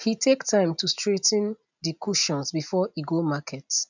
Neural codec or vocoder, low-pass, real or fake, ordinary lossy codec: none; 7.2 kHz; real; none